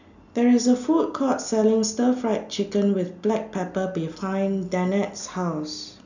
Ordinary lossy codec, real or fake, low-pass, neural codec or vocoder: none; real; 7.2 kHz; none